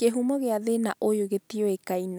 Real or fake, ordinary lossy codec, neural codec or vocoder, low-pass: real; none; none; none